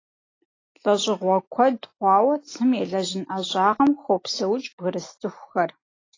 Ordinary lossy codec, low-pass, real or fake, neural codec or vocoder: AAC, 32 kbps; 7.2 kHz; real; none